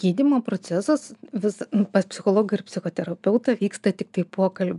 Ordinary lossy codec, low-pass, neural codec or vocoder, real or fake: MP3, 96 kbps; 10.8 kHz; none; real